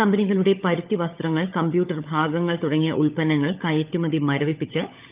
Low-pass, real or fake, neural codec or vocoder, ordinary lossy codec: 3.6 kHz; fake; codec, 16 kHz, 16 kbps, FunCodec, trained on LibriTTS, 50 frames a second; Opus, 32 kbps